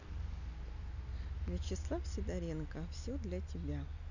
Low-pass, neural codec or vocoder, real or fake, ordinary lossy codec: 7.2 kHz; none; real; none